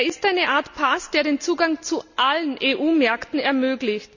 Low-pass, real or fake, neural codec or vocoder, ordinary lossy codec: 7.2 kHz; real; none; none